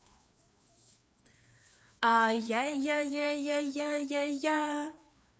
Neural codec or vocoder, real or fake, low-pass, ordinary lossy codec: codec, 16 kHz, 2 kbps, FreqCodec, larger model; fake; none; none